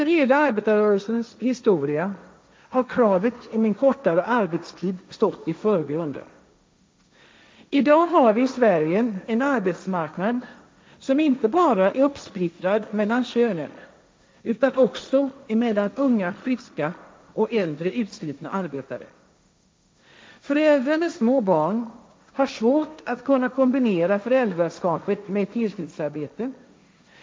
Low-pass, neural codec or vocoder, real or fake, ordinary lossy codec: none; codec, 16 kHz, 1.1 kbps, Voila-Tokenizer; fake; none